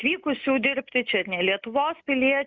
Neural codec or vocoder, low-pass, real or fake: none; 7.2 kHz; real